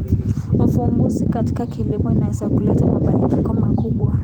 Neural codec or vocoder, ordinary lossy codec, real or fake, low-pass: vocoder, 44.1 kHz, 128 mel bands every 512 samples, BigVGAN v2; Opus, 24 kbps; fake; 19.8 kHz